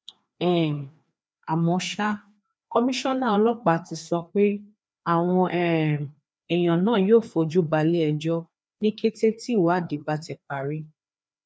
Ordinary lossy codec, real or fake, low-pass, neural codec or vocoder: none; fake; none; codec, 16 kHz, 2 kbps, FreqCodec, larger model